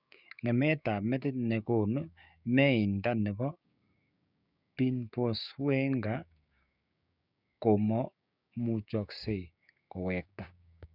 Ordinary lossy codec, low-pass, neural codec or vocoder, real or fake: none; 5.4 kHz; codec, 16 kHz, 6 kbps, DAC; fake